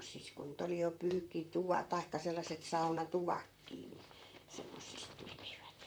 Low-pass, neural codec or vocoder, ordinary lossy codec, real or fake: none; vocoder, 44.1 kHz, 128 mel bands, Pupu-Vocoder; none; fake